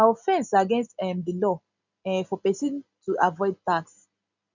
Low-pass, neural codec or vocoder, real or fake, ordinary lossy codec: 7.2 kHz; none; real; none